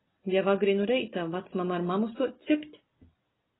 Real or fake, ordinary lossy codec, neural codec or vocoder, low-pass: real; AAC, 16 kbps; none; 7.2 kHz